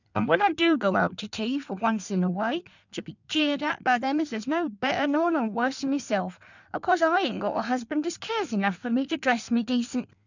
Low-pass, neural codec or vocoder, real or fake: 7.2 kHz; codec, 16 kHz in and 24 kHz out, 1.1 kbps, FireRedTTS-2 codec; fake